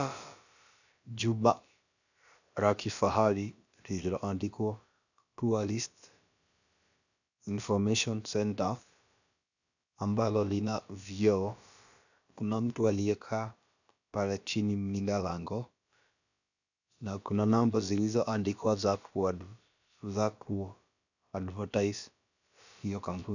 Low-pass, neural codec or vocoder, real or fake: 7.2 kHz; codec, 16 kHz, about 1 kbps, DyCAST, with the encoder's durations; fake